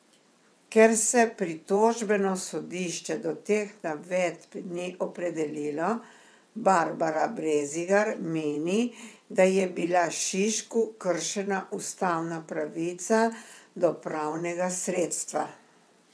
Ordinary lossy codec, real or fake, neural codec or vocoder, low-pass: none; fake; vocoder, 22.05 kHz, 80 mel bands, WaveNeXt; none